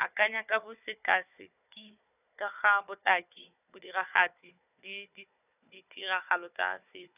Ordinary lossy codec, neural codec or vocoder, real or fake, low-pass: none; codec, 16 kHz, 4 kbps, FunCodec, trained on Chinese and English, 50 frames a second; fake; 3.6 kHz